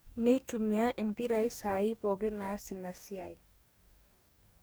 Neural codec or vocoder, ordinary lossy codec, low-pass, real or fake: codec, 44.1 kHz, 2.6 kbps, DAC; none; none; fake